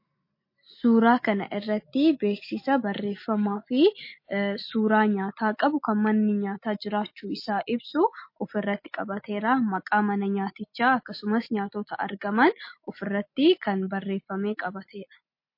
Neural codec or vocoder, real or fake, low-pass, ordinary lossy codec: none; real; 5.4 kHz; MP3, 32 kbps